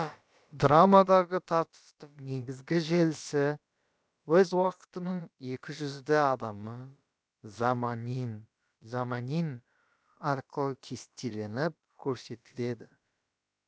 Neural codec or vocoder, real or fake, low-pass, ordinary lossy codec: codec, 16 kHz, about 1 kbps, DyCAST, with the encoder's durations; fake; none; none